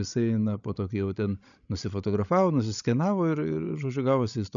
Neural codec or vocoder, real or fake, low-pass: codec, 16 kHz, 16 kbps, FreqCodec, larger model; fake; 7.2 kHz